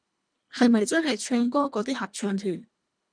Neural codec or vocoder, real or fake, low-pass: codec, 24 kHz, 1.5 kbps, HILCodec; fake; 9.9 kHz